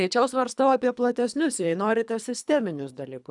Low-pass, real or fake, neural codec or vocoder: 10.8 kHz; fake; codec, 24 kHz, 3 kbps, HILCodec